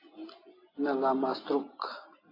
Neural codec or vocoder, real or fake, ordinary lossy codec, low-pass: none; real; AAC, 24 kbps; 5.4 kHz